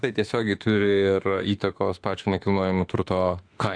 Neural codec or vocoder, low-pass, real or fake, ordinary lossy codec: autoencoder, 48 kHz, 32 numbers a frame, DAC-VAE, trained on Japanese speech; 9.9 kHz; fake; AAC, 64 kbps